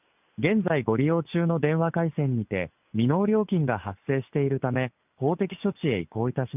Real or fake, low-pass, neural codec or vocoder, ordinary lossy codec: real; 3.6 kHz; none; none